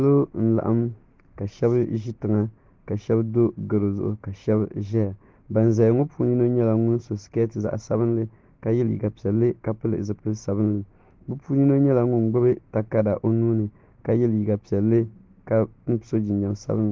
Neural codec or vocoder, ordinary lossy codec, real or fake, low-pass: none; Opus, 16 kbps; real; 7.2 kHz